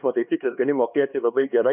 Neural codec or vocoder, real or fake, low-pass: codec, 16 kHz, 2 kbps, X-Codec, HuBERT features, trained on LibriSpeech; fake; 3.6 kHz